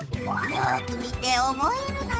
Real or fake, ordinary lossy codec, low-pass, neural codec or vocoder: fake; none; none; codec, 16 kHz, 8 kbps, FunCodec, trained on Chinese and English, 25 frames a second